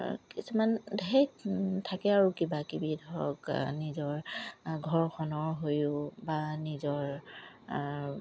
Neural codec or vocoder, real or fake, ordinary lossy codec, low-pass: none; real; none; none